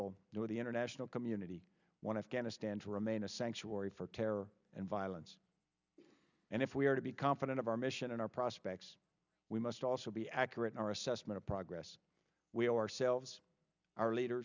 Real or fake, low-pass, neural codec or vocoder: real; 7.2 kHz; none